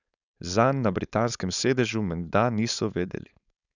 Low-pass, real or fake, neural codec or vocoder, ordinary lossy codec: 7.2 kHz; fake; codec, 16 kHz, 4.8 kbps, FACodec; none